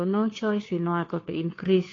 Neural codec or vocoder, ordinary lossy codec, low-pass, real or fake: codec, 16 kHz, 2 kbps, FunCodec, trained on Chinese and English, 25 frames a second; AAC, 32 kbps; 7.2 kHz; fake